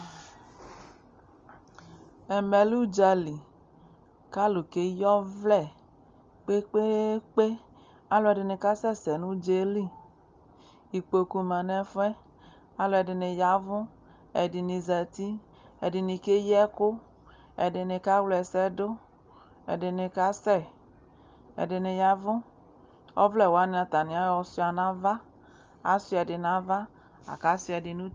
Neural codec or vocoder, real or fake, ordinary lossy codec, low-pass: none; real; Opus, 32 kbps; 7.2 kHz